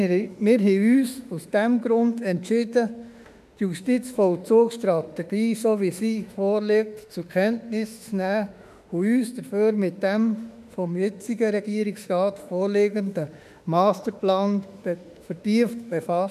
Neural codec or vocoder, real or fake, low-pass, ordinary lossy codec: autoencoder, 48 kHz, 32 numbers a frame, DAC-VAE, trained on Japanese speech; fake; 14.4 kHz; none